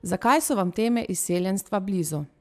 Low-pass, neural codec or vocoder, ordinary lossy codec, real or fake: 14.4 kHz; none; AAC, 96 kbps; real